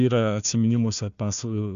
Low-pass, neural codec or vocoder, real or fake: 7.2 kHz; codec, 16 kHz, 1 kbps, FunCodec, trained on Chinese and English, 50 frames a second; fake